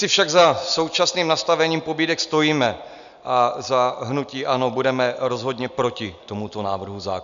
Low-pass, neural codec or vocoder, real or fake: 7.2 kHz; none; real